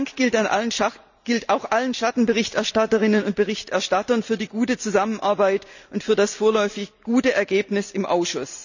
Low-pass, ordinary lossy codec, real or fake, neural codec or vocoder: 7.2 kHz; none; real; none